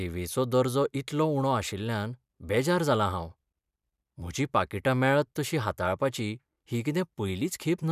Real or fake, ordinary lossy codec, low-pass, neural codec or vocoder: fake; none; 14.4 kHz; vocoder, 44.1 kHz, 128 mel bands every 256 samples, BigVGAN v2